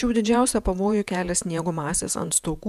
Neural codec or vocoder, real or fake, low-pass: vocoder, 48 kHz, 128 mel bands, Vocos; fake; 14.4 kHz